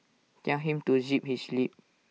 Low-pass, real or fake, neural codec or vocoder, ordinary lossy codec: none; real; none; none